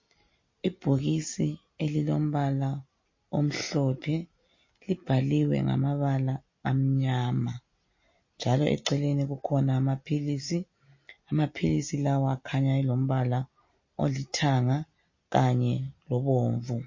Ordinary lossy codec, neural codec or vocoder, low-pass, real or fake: MP3, 32 kbps; none; 7.2 kHz; real